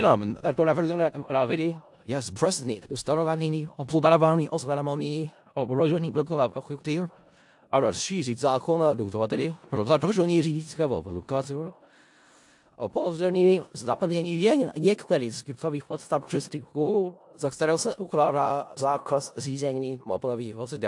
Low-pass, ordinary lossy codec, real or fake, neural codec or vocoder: 10.8 kHz; AAC, 64 kbps; fake; codec, 16 kHz in and 24 kHz out, 0.4 kbps, LongCat-Audio-Codec, four codebook decoder